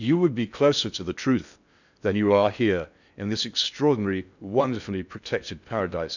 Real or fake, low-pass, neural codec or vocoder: fake; 7.2 kHz; codec, 16 kHz in and 24 kHz out, 0.6 kbps, FocalCodec, streaming, 4096 codes